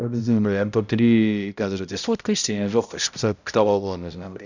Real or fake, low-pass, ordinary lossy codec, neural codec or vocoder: fake; 7.2 kHz; none; codec, 16 kHz, 0.5 kbps, X-Codec, HuBERT features, trained on balanced general audio